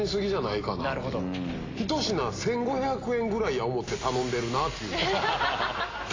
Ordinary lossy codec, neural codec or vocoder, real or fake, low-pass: AAC, 32 kbps; none; real; 7.2 kHz